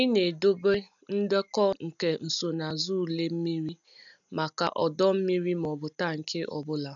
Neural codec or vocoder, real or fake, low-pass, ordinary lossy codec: none; real; 7.2 kHz; none